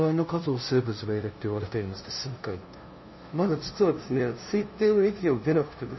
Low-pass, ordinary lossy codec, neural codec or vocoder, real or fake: 7.2 kHz; MP3, 24 kbps; codec, 16 kHz, 0.5 kbps, FunCodec, trained on LibriTTS, 25 frames a second; fake